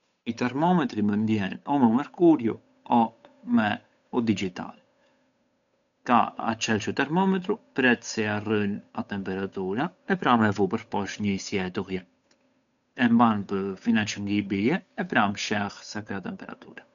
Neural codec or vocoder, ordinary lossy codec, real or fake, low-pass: codec, 16 kHz, 8 kbps, FunCodec, trained on Chinese and English, 25 frames a second; none; fake; 7.2 kHz